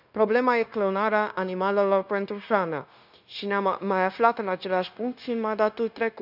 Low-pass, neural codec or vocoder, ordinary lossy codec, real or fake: 5.4 kHz; codec, 16 kHz, 0.9 kbps, LongCat-Audio-Codec; none; fake